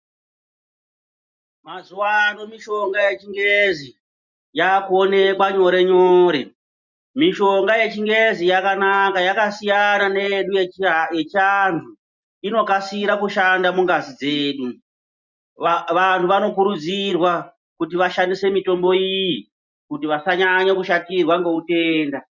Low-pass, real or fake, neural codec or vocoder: 7.2 kHz; real; none